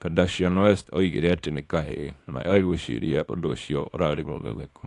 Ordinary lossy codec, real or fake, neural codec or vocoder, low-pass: AAC, 48 kbps; fake; codec, 24 kHz, 0.9 kbps, WavTokenizer, small release; 10.8 kHz